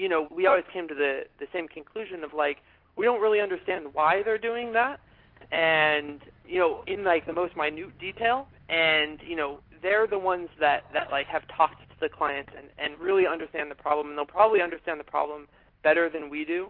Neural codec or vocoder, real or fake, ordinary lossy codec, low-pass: none; real; Opus, 32 kbps; 5.4 kHz